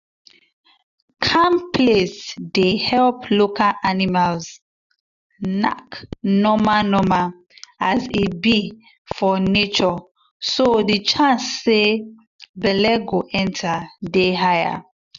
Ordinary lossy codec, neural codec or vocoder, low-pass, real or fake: none; none; 7.2 kHz; real